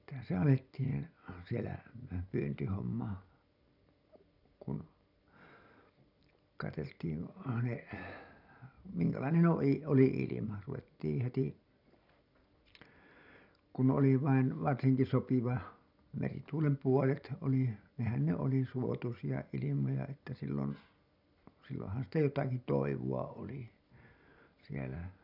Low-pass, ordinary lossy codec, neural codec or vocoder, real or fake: 5.4 kHz; none; none; real